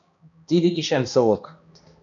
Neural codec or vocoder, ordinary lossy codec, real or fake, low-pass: codec, 16 kHz, 1 kbps, X-Codec, HuBERT features, trained on balanced general audio; AAC, 64 kbps; fake; 7.2 kHz